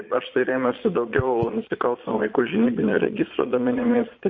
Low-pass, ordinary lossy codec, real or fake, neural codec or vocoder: 7.2 kHz; MP3, 32 kbps; fake; vocoder, 22.05 kHz, 80 mel bands, Vocos